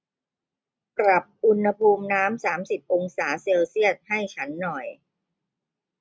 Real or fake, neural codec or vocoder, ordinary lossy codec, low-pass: real; none; none; none